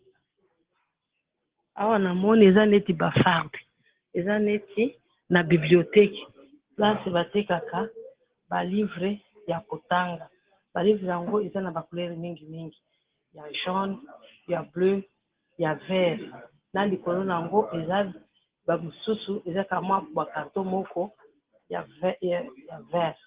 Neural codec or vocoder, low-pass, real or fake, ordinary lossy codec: none; 3.6 kHz; real; Opus, 16 kbps